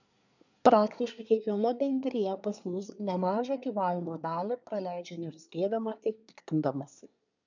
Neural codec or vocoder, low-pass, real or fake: codec, 24 kHz, 1 kbps, SNAC; 7.2 kHz; fake